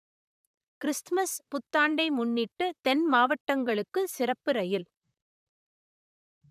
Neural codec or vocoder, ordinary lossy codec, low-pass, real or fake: vocoder, 44.1 kHz, 128 mel bands, Pupu-Vocoder; none; 14.4 kHz; fake